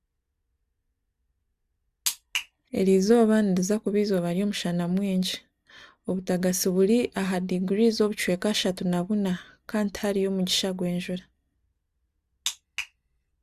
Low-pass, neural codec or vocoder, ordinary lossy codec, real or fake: 14.4 kHz; none; Opus, 64 kbps; real